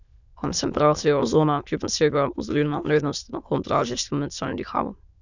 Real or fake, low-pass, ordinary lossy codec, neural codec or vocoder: fake; 7.2 kHz; none; autoencoder, 22.05 kHz, a latent of 192 numbers a frame, VITS, trained on many speakers